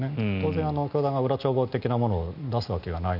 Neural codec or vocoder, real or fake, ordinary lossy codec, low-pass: none; real; none; 5.4 kHz